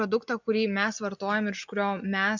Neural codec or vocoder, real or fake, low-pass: none; real; 7.2 kHz